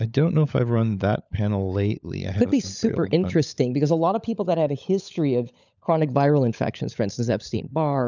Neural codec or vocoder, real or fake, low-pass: codec, 16 kHz, 16 kbps, FunCodec, trained on LibriTTS, 50 frames a second; fake; 7.2 kHz